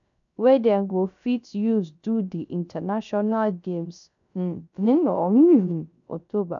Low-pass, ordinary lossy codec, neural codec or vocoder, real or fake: 7.2 kHz; none; codec, 16 kHz, 0.3 kbps, FocalCodec; fake